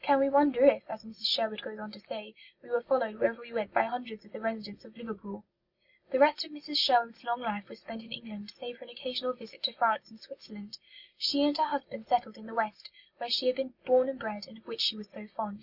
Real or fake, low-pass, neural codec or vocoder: real; 5.4 kHz; none